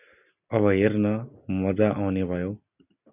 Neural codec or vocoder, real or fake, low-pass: none; real; 3.6 kHz